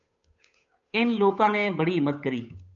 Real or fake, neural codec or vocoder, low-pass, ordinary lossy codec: fake; codec, 16 kHz, 8 kbps, FunCodec, trained on Chinese and English, 25 frames a second; 7.2 kHz; MP3, 96 kbps